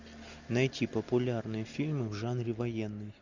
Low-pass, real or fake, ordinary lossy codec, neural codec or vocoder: 7.2 kHz; real; MP3, 64 kbps; none